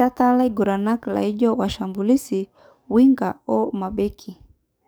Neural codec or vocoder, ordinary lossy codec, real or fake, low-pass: codec, 44.1 kHz, 7.8 kbps, DAC; none; fake; none